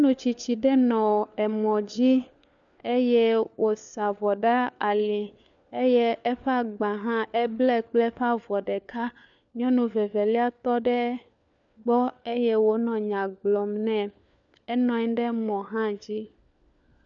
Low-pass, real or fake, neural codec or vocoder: 7.2 kHz; fake; codec, 16 kHz, 4 kbps, FunCodec, trained on LibriTTS, 50 frames a second